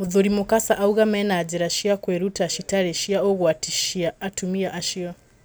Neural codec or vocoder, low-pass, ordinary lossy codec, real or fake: none; none; none; real